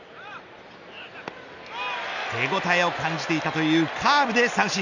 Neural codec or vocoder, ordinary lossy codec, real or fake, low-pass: none; none; real; 7.2 kHz